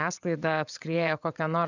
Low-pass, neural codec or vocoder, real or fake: 7.2 kHz; vocoder, 22.05 kHz, 80 mel bands, WaveNeXt; fake